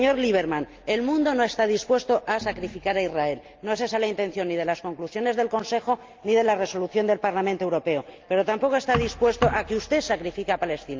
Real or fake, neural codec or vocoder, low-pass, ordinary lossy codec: real; none; 7.2 kHz; Opus, 24 kbps